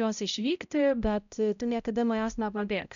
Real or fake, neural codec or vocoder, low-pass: fake; codec, 16 kHz, 0.5 kbps, X-Codec, HuBERT features, trained on balanced general audio; 7.2 kHz